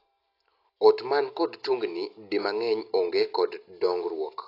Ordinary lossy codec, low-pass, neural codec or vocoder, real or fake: AAC, 32 kbps; 5.4 kHz; none; real